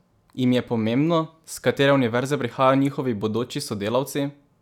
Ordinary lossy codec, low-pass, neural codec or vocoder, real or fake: none; 19.8 kHz; none; real